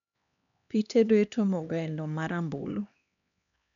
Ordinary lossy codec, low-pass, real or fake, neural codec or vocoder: none; 7.2 kHz; fake; codec, 16 kHz, 2 kbps, X-Codec, HuBERT features, trained on LibriSpeech